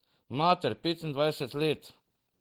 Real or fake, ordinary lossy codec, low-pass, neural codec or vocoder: real; Opus, 16 kbps; 19.8 kHz; none